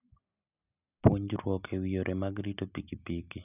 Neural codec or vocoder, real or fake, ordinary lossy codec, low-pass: none; real; none; 3.6 kHz